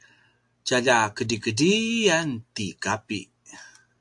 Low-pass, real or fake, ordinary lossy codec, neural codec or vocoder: 10.8 kHz; real; AAC, 64 kbps; none